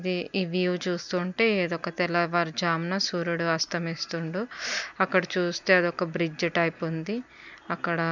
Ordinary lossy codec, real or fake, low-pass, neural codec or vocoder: none; real; 7.2 kHz; none